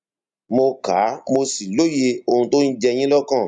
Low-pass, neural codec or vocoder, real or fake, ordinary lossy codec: 9.9 kHz; none; real; none